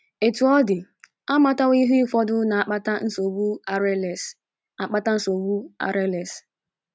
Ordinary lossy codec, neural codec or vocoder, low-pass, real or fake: none; none; none; real